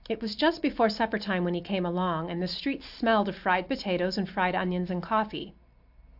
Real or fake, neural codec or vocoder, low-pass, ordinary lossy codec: real; none; 5.4 kHz; AAC, 48 kbps